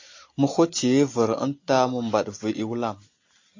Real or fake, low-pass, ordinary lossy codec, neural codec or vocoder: real; 7.2 kHz; AAC, 48 kbps; none